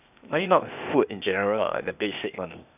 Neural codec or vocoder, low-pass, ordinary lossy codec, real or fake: codec, 16 kHz, 0.8 kbps, ZipCodec; 3.6 kHz; none; fake